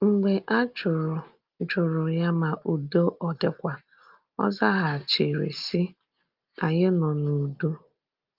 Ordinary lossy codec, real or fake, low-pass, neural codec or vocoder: Opus, 24 kbps; real; 5.4 kHz; none